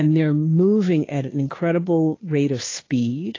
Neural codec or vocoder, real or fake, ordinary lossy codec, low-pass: codec, 16 kHz, 2 kbps, FunCodec, trained on Chinese and English, 25 frames a second; fake; AAC, 32 kbps; 7.2 kHz